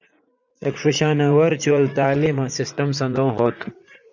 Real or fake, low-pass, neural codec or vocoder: fake; 7.2 kHz; vocoder, 44.1 kHz, 80 mel bands, Vocos